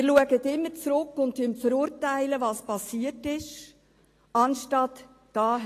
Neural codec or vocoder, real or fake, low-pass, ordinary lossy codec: none; real; 14.4 kHz; AAC, 48 kbps